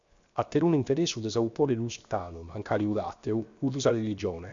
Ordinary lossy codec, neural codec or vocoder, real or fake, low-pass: Opus, 64 kbps; codec, 16 kHz, 0.7 kbps, FocalCodec; fake; 7.2 kHz